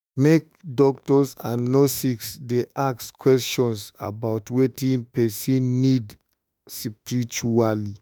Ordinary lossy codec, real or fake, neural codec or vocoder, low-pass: none; fake; autoencoder, 48 kHz, 32 numbers a frame, DAC-VAE, trained on Japanese speech; none